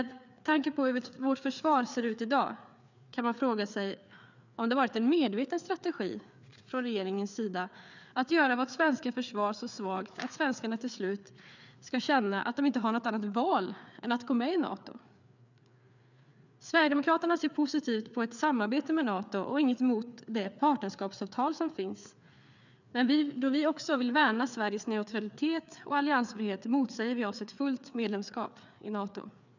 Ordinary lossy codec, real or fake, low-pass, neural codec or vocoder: none; fake; 7.2 kHz; codec, 16 kHz, 4 kbps, FreqCodec, larger model